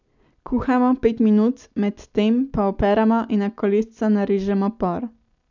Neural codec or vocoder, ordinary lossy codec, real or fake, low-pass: none; none; real; 7.2 kHz